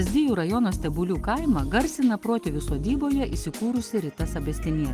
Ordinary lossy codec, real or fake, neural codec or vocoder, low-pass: Opus, 24 kbps; real; none; 14.4 kHz